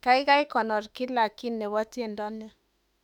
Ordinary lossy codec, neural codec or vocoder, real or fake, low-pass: none; autoencoder, 48 kHz, 32 numbers a frame, DAC-VAE, trained on Japanese speech; fake; 19.8 kHz